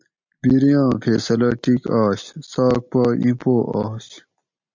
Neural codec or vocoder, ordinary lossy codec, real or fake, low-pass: none; MP3, 64 kbps; real; 7.2 kHz